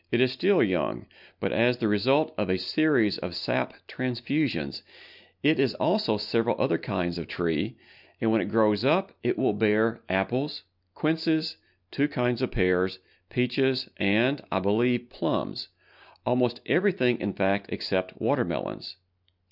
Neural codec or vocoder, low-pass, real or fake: none; 5.4 kHz; real